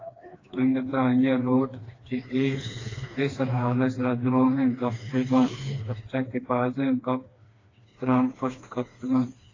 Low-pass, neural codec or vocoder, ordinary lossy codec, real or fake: 7.2 kHz; codec, 16 kHz, 2 kbps, FreqCodec, smaller model; AAC, 32 kbps; fake